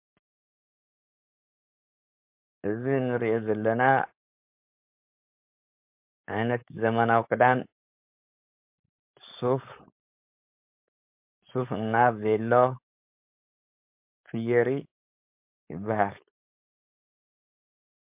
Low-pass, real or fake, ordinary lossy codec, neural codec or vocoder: 3.6 kHz; fake; MP3, 32 kbps; codec, 16 kHz, 4.8 kbps, FACodec